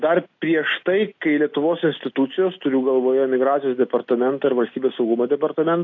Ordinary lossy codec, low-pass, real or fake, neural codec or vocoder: AAC, 48 kbps; 7.2 kHz; real; none